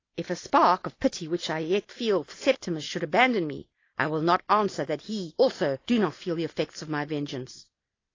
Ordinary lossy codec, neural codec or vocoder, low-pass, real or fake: AAC, 32 kbps; none; 7.2 kHz; real